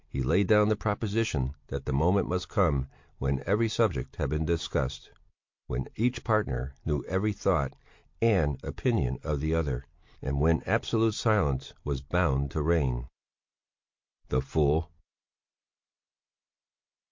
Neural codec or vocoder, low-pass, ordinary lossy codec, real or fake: none; 7.2 kHz; MP3, 48 kbps; real